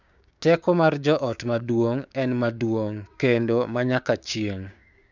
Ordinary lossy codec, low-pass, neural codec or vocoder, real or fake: none; 7.2 kHz; codec, 16 kHz, 6 kbps, DAC; fake